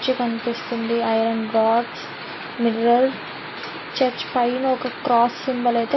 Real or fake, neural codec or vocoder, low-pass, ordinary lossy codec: real; none; 7.2 kHz; MP3, 24 kbps